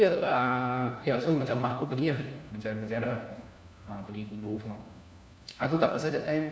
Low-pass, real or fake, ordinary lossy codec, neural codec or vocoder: none; fake; none; codec, 16 kHz, 1 kbps, FunCodec, trained on LibriTTS, 50 frames a second